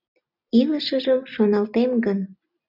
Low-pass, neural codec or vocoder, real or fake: 5.4 kHz; none; real